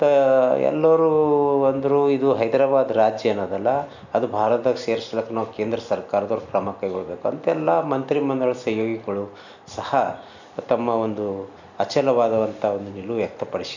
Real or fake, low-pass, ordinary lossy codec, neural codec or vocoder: real; 7.2 kHz; none; none